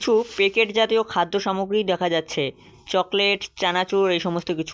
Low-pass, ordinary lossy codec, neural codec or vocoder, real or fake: none; none; none; real